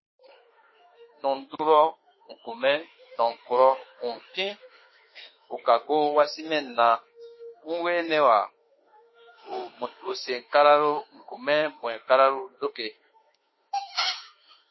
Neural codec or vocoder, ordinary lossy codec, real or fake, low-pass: autoencoder, 48 kHz, 32 numbers a frame, DAC-VAE, trained on Japanese speech; MP3, 24 kbps; fake; 7.2 kHz